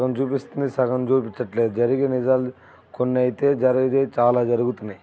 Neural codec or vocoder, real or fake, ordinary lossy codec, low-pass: none; real; none; none